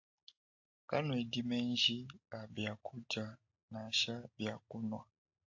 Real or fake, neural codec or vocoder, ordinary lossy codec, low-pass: real; none; AAC, 48 kbps; 7.2 kHz